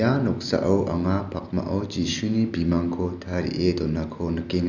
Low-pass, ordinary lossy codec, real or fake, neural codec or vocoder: 7.2 kHz; none; real; none